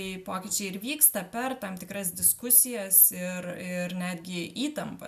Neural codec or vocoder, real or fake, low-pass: none; real; 14.4 kHz